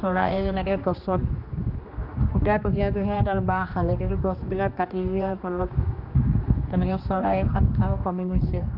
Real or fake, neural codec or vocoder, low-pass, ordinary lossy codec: fake; codec, 16 kHz, 2 kbps, X-Codec, HuBERT features, trained on general audio; 5.4 kHz; none